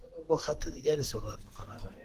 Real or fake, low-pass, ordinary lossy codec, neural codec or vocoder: fake; 14.4 kHz; Opus, 16 kbps; codec, 32 kHz, 1.9 kbps, SNAC